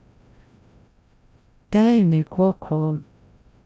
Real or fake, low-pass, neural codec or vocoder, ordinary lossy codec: fake; none; codec, 16 kHz, 0.5 kbps, FreqCodec, larger model; none